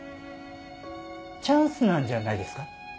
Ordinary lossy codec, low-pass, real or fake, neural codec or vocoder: none; none; real; none